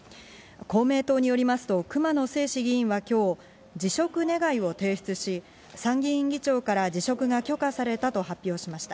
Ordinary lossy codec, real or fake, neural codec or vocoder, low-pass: none; real; none; none